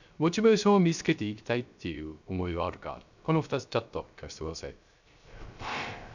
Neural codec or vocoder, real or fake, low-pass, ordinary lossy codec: codec, 16 kHz, 0.3 kbps, FocalCodec; fake; 7.2 kHz; none